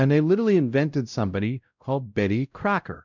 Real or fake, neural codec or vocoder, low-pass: fake; codec, 16 kHz, 0.5 kbps, X-Codec, WavLM features, trained on Multilingual LibriSpeech; 7.2 kHz